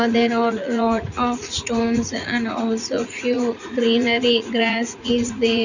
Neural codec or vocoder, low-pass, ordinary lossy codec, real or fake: vocoder, 44.1 kHz, 80 mel bands, Vocos; 7.2 kHz; none; fake